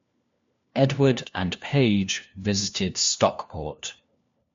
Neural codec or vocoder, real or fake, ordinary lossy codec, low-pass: codec, 16 kHz, 1 kbps, FunCodec, trained on LibriTTS, 50 frames a second; fake; MP3, 48 kbps; 7.2 kHz